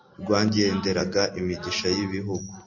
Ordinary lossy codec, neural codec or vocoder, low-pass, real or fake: MP3, 32 kbps; none; 7.2 kHz; real